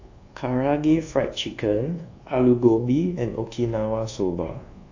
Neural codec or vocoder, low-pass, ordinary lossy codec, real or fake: codec, 24 kHz, 1.2 kbps, DualCodec; 7.2 kHz; AAC, 48 kbps; fake